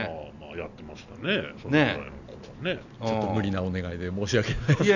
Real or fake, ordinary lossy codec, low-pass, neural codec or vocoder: real; none; 7.2 kHz; none